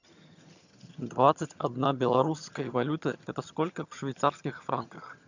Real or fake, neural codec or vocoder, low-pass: fake; vocoder, 22.05 kHz, 80 mel bands, HiFi-GAN; 7.2 kHz